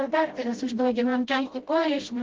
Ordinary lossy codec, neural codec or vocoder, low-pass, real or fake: Opus, 16 kbps; codec, 16 kHz, 0.5 kbps, FreqCodec, smaller model; 7.2 kHz; fake